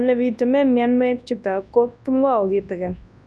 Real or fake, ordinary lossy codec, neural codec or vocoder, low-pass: fake; none; codec, 24 kHz, 0.9 kbps, WavTokenizer, large speech release; none